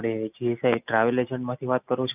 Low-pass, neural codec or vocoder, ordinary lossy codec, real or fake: 3.6 kHz; none; none; real